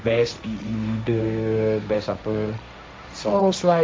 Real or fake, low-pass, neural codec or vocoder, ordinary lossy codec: fake; none; codec, 16 kHz, 1.1 kbps, Voila-Tokenizer; none